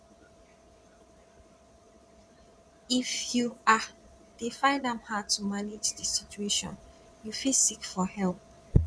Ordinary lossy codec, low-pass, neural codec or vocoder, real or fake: none; none; vocoder, 22.05 kHz, 80 mel bands, Vocos; fake